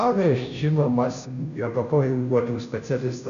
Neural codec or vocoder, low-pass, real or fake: codec, 16 kHz, 0.5 kbps, FunCodec, trained on Chinese and English, 25 frames a second; 7.2 kHz; fake